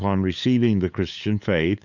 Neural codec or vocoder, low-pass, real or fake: codec, 16 kHz, 8 kbps, FunCodec, trained on LibriTTS, 25 frames a second; 7.2 kHz; fake